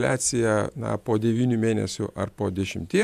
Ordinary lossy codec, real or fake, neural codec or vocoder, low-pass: MP3, 96 kbps; fake; vocoder, 48 kHz, 128 mel bands, Vocos; 14.4 kHz